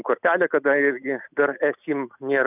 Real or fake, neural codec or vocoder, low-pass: real; none; 3.6 kHz